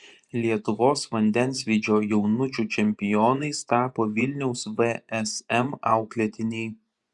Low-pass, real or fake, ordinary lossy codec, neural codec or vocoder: 10.8 kHz; fake; Opus, 64 kbps; vocoder, 24 kHz, 100 mel bands, Vocos